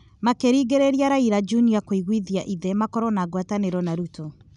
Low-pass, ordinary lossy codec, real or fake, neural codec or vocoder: 10.8 kHz; none; real; none